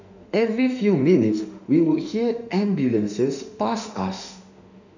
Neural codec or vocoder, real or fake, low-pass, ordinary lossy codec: autoencoder, 48 kHz, 32 numbers a frame, DAC-VAE, trained on Japanese speech; fake; 7.2 kHz; none